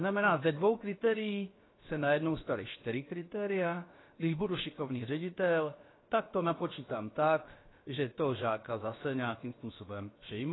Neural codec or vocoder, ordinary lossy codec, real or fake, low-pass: codec, 16 kHz, about 1 kbps, DyCAST, with the encoder's durations; AAC, 16 kbps; fake; 7.2 kHz